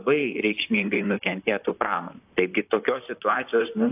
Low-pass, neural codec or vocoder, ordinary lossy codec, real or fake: 3.6 kHz; vocoder, 44.1 kHz, 128 mel bands, Pupu-Vocoder; AAC, 32 kbps; fake